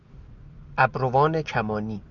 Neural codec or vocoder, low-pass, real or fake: none; 7.2 kHz; real